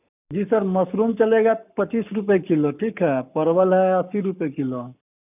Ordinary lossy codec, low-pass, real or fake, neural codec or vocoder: none; 3.6 kHz; fake; codec, 44.1 kHz, 7.8 kbps, Pupu-Codec